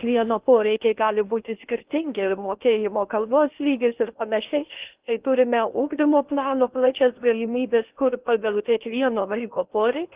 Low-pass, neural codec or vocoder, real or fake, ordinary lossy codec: 3.6 kHz; codec, 16 kHz in and 24 kHz out, 0.8 kbps, FocalCodec, streaming, 65536 codes; fake; Opus, 32 kbps